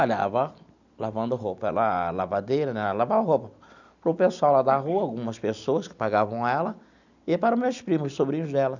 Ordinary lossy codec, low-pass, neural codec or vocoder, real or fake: none; 7.2 kHz; none; real